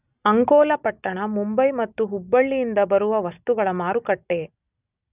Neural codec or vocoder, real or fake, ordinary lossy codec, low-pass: none; real; none; 3.6 kHz